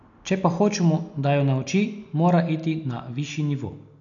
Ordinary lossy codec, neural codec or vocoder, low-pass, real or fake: none; none; 7.2 kHz; real